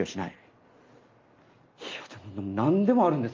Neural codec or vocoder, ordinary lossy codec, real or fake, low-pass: none; Opus, 32 kbps; real; 7.2 kHz